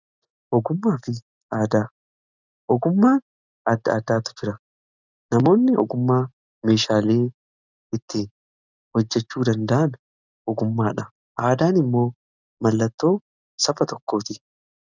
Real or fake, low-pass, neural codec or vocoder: real; 7.2 kHz; none